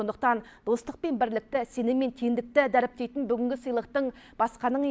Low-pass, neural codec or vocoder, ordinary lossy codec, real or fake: none; none; none; real